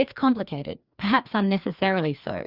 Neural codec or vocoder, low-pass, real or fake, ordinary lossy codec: codec, 16 kHz, 2 kbps, FreqCodec, larger model; 5.4 kHz; fake; Opus, 64 kbps